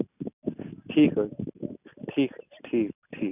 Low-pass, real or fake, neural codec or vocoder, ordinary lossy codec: 3.6 kHz; real; none; none